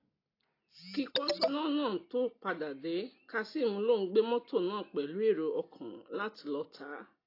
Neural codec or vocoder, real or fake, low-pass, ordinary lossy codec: none; real; 5.4 kHz; AAC, 32 kbps